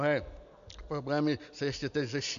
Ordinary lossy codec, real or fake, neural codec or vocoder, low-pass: AAC, 96 kbps; real; none; 7.2 kHz